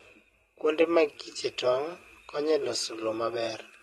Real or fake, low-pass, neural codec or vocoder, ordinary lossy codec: fake; 19.8 kHz; vocoder, 44.1 kHz, 128 mel bands every 512 samples, BigVGAN v2; AAC, 32 kbps